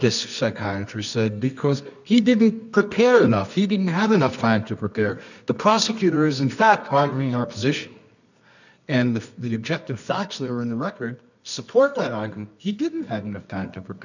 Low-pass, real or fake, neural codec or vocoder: 7.2 kHz; fake; codec, 24 kHz, 0.9 kbps, WavTokenizer, medium music audio release